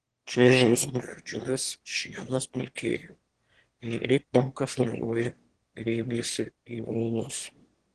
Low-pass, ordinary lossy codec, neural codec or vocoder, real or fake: 9.9 kHz; Opus, 16 kbps; autoencoder, 22.05 kHz, a latent of 192 numbers a frame, VITS, trained on one speaker; fake